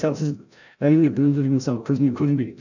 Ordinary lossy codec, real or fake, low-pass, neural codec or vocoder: none; fake; 7.2 kHz; codec, 16 kHz, 0.5 kbps, FreqCodec, larger model